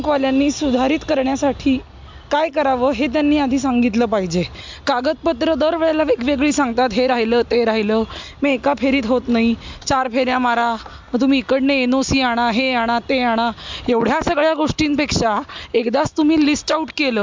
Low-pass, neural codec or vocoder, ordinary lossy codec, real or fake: 7.2 kHz; none; MP3, 64 kbps; real